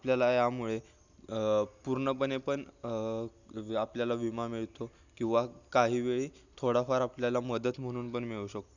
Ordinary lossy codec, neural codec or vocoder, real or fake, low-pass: none; none; real; 7.2 kHz